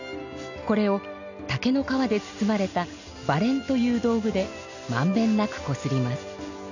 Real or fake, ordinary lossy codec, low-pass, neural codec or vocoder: real; none; 7.2 kHz; none